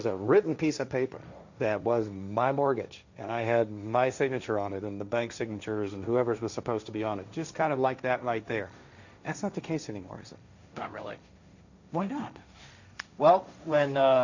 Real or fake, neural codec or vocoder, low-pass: fake; codec, 16 kHz, 1.1 kbps, Voila-Tokenizer; 7.2 kHz